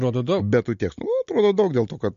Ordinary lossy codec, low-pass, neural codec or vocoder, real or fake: MP3, 48 kbps; 7.2 kHz; none; real